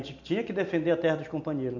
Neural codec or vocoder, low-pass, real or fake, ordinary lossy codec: none; 7.2 kHz; real; none